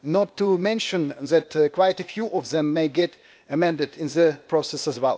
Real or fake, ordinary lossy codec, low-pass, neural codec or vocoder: fake; none; none; codec, 16 kHz, 0.8 kbps, ZipCodec